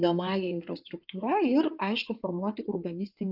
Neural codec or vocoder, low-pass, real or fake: codec, 16 kHz in and 24 kHz out, 2.2 kbps, FireRedTTS-2 codec; 5.4 kHz; fake